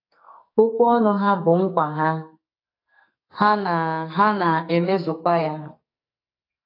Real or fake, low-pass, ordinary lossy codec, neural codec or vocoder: fake; 5.4 kHz; AAC, 32 kbps; codec, 44.1 kHz, 2.6 kbps, SNAC